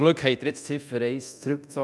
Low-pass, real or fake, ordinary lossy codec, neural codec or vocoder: none; fake; none; codec, 24 kHz, 0.9 kbps, DualCodec